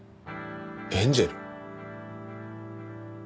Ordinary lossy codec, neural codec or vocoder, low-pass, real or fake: none; none; none; real